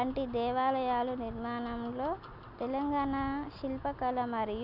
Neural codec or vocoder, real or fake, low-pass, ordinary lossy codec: none; real; 5.4 kHz; none